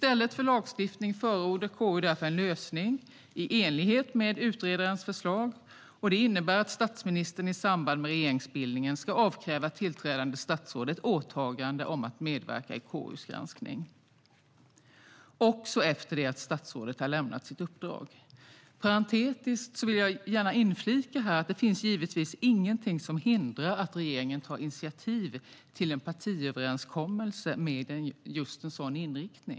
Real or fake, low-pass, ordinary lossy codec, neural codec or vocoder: real; none; none; none